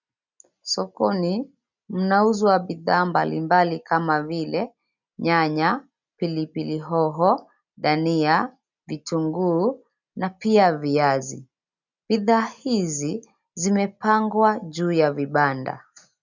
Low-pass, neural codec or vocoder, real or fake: 7.2 kHz; none; real